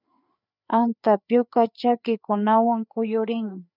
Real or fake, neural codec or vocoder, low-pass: fake; codec, 16 kHz, 4 kbps, FreqCodec, larger model; 5.4 kHz